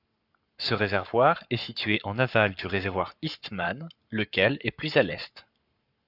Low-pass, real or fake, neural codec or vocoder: 5.4 kHz; fake; codec, 44.1 kHz, 7.8 kbps, Pupu-Codec